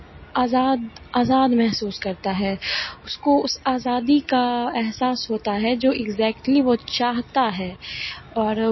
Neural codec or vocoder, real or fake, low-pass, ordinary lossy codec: none; real; 7.2 kHz; MP3, 24 kbps